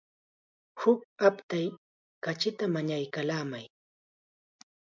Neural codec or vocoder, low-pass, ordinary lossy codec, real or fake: none; 7.2 kHz; MP3, 64 kbps; real